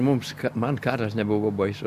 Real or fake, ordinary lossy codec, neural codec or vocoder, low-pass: real; AAC, 96 kbps; none; 14.4 kHz